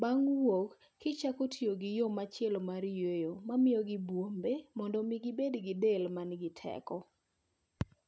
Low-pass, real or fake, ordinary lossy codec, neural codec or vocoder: none; real; none; none